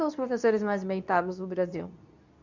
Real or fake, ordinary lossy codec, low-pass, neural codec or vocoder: fake; none; 7.2 kHz; codec, 24 kHz, 0.9 kbps, WavTokenizer, medium speech release version 2